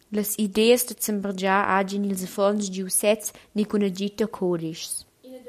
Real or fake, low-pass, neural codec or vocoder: real; 14.4 kHz; none